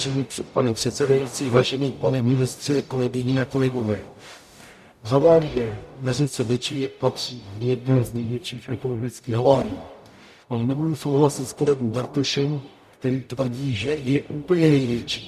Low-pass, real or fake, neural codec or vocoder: 14.4 kHz; fake; codec, 44.1 kHz, 0.9 kbps, DAC